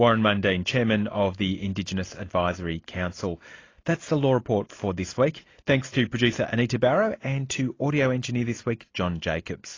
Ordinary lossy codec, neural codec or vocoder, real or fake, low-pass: AAC, 32 kbps; none; real; 7.2 kHz